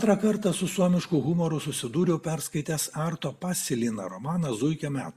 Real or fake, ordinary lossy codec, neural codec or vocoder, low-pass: real; Opus, 64 kbps; none; 14.4 kHz